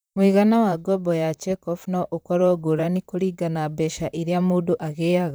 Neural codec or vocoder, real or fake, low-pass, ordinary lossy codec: vocoder, 44.1 kHz, 128 mel bands, Pupu-Vocoder; fake; none; none